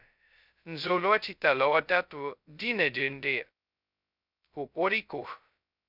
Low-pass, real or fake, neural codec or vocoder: 5.4 kHz; fake; codec, 16 kHz, 0.2 kbps, FocalCodec